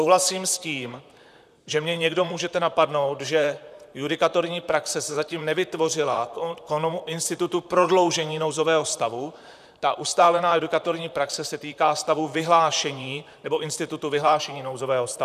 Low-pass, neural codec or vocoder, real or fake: 14.4 kHz; vocoder, 44.1 kHz, 128 mel bands, Pupu-Vocoder; fake